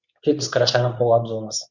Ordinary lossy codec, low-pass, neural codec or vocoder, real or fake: none; 7.2 kHz; codec, 24 kHz, 0.9 kbps, WavTokenizer, medium speech release version 2; fake